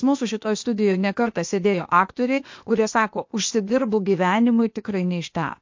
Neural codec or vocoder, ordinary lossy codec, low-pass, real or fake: codec, 16 kHz, 0.8 kbps, ZipCodec; MP3, 48 kbps; 7.2 kHz; fake